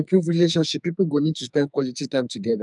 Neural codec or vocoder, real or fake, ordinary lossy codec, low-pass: codec, 44.1 kHz, 2.6 kbps, SNAC; fake; none; 10.8 kHz